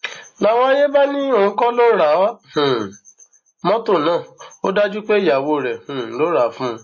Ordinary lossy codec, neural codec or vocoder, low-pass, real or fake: MP3, 32 kbps; none; 7.2 kHz; real